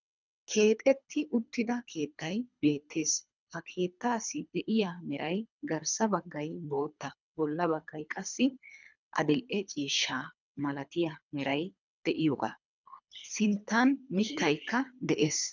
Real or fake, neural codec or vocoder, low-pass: fake; codec, 24 kHz, 3 kbps, HILCodec; 7.2 kHz